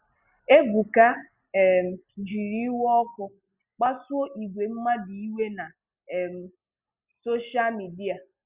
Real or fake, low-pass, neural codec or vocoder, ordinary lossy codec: real; 3.6 kHz; none; Opus, 64 kbps